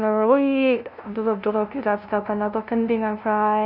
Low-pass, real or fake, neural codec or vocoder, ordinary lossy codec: 5.4 kHz; fake; codec, 16 kHz, 0.5 kbps, FunCodec, trained on LibriTTS, 25 frames a second; none